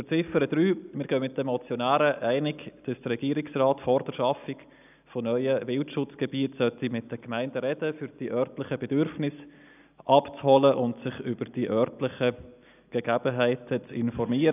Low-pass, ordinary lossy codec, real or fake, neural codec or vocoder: 3.6 kHz; none; real; none